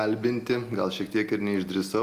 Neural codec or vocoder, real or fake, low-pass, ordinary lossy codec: none; real; 14.4 kHz; Opus, 32 kbps